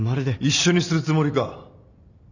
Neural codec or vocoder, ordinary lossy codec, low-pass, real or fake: none; none; 7.2 kHz; real